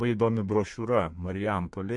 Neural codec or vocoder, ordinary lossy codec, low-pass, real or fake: codec, 32 kHz, 1.9 kbps, SNAC; MP3, 64 kbps; 10.8 kHz; fake